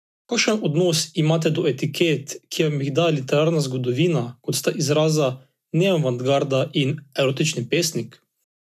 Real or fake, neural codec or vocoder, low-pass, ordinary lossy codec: real; none; 14.4 kHz; none